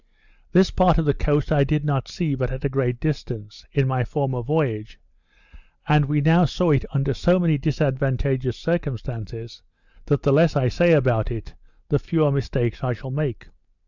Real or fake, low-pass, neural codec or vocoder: real; 7.2 kHz; none